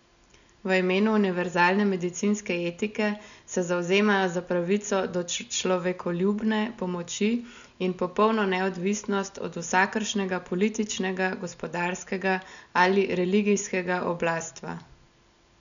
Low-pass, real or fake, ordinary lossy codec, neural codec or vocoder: 7.2 kHz; real; none; none